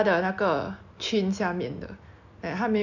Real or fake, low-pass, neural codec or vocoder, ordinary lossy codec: real; 7.2 kHz; none; none